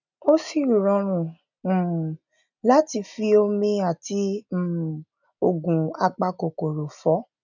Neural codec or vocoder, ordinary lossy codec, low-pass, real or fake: none; none; 7.2 kHz; real